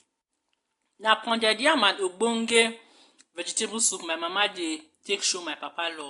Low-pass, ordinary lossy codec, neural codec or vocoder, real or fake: 10.8 kHz; AAC, 48 kbps; none; real